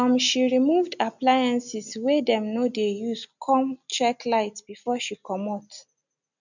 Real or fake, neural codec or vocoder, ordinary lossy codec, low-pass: real; none; none; 7.2 kHz